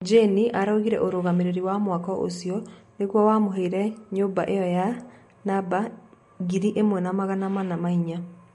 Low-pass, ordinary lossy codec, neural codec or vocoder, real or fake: 19.8 kHz; MP3, 48 kbps; none; real